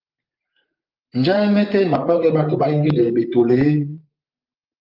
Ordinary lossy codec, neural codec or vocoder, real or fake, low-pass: Opus, 24 kbps; vocoder, 44.1 kHz, 128 mel bands, Pupu-Vocoder; fake; 5.4 kHz